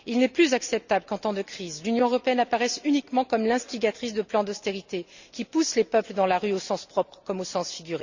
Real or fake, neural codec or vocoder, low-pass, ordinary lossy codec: real; none; 7.2 kHz; Opus, 64 kbps